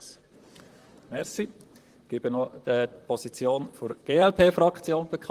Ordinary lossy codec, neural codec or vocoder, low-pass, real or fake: Opus, 24 kbps; vocoder, 44.1 kHz, 128 mel bands, Pupu-Vocoder; 14.4 kHz; fake